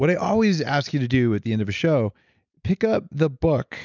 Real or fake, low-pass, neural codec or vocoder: real; 7.2 kHz; none